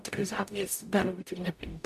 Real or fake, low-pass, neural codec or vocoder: fake; 14.4 kHz; codec, 44.1 kHz, 0.9 kbps, DAC